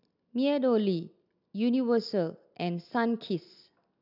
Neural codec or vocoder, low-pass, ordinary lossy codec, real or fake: none; 5.4 kHz; none; real